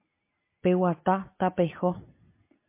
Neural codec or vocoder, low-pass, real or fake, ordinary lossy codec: none; 3.6 kHz; real; MP3, 24 kbps